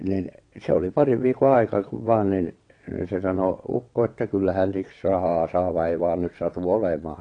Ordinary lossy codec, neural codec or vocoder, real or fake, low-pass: AAC, 48 kbps; vocoder, 22.05 kHz, 80 mel bands, WaveNeXt; fake; 9.9 kHz